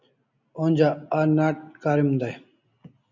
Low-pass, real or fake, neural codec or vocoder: 7.2 kHz; real; none